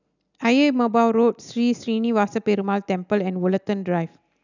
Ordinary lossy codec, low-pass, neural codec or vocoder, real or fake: none; 7.2 kHz; none; real